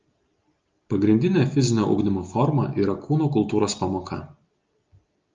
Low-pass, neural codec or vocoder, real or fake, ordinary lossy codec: 7.2 kHz; none; real; Opus, 24 kbps